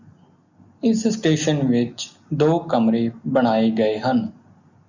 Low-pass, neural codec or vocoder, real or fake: 7.2 kHz; none; real